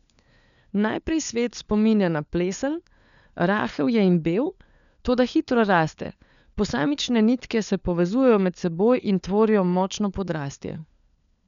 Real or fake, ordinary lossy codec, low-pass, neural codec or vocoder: fake; none; 7.2 kHz; codec, 16 kHz, 4 kbps, FunCodec, trained on LibriTTS, 50 frames a second